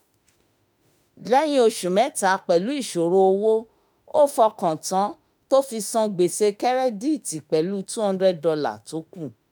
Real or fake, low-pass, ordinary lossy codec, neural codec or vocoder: fake; none; none; autoencoder, 48 kHz, 32 numbers a frame, DAC-VAE, trained on Japanese speech